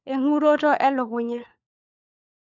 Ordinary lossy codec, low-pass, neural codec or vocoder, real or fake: none; 7.2 kHz; codec, 16 kHz, 4 kbps, FunCodec, trained on LibriTTS, 50 frames a second; fake